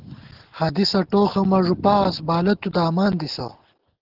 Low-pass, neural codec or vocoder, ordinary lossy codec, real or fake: 5.4 kHz; none; Opus, 16 kbps; real